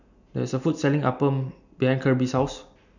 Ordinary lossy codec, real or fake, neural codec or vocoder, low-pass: none; real; none; 7.2 kHz